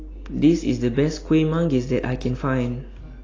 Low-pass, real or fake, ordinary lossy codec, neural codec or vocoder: 7.2 kHz; real; AAC, 32 kbps; none